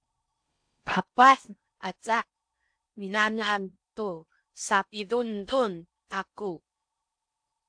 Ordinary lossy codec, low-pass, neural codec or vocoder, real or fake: AAC, 64 kbps; 9.9 kHz; codec, 16 kHz in and 24 kHz out, 0.6 kbps, FocalCodec, streaming, 4096 codes; fake